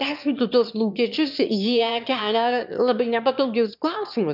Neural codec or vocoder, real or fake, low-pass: autoencoder, 22.05 kHz, a latent of 192 numbers a frame, VITS, trained on one speaker; fake; 5.4 kHz